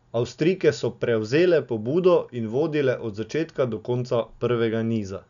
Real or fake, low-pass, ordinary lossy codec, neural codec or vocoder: real; 7.2 kHz; none; none